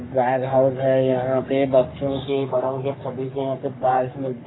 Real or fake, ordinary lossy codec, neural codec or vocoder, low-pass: fake; AAC, 16 kbps; codec, 44.1 kHz, 3.4 kbps, Pupu-Codec; 7.2 kHz